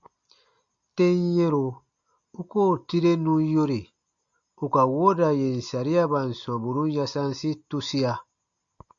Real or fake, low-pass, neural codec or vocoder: real; 7.2 kHz; none